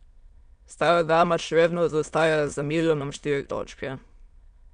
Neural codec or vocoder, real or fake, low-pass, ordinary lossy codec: autoencoder, 22.05 kHz, a latent of 192 numbers a frame, VITS, trained on many speakers; fake; 9.9 kHz; none